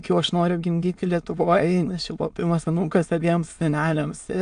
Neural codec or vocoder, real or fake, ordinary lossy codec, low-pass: autoencoder, 22.05 kHz, a latent of 192 numbers a frame, VITS, trained on many speakers; fake; AAC, 64 kbps; 9.9 kHz